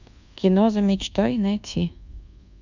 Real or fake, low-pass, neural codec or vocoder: fake; 7.2 kHz; codec, 24 kHz, 1.2 kbps, DualCodec